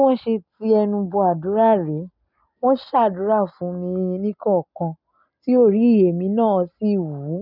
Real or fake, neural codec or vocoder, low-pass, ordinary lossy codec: real; none; 5.4 kHz; none